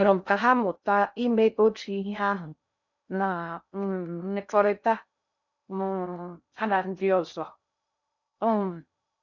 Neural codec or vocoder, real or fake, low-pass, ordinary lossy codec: codec, 16 kHz in and 24 kHz out, 0.6 kbps, FocalCodec, streaming, 2048 codes; fake; 7.2 kHz; none